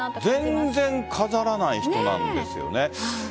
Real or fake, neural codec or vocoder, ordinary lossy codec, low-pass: real; none; none; none